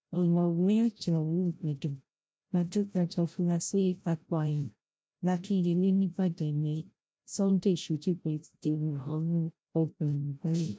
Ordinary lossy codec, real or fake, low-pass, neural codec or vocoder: none; fake; none; codec, 16 kHz, 0.5 kbps, FreqCodec, larger model